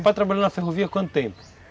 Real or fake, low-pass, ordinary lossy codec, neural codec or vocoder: real; none; none; none